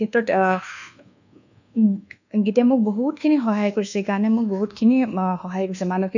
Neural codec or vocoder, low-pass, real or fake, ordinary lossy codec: codec, 24 kHz, 1.2 kbps, DualCodec; 7.2 kHz; fake; none